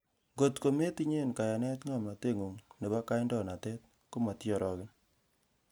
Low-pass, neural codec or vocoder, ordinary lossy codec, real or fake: none; none; none; real